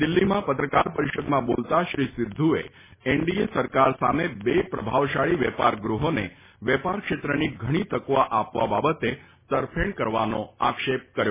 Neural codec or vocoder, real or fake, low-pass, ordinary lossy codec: none; real; 3.6 kHz; MP3, 16 kbps